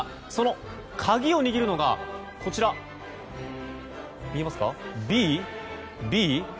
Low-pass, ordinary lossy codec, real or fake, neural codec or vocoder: none; none; real; none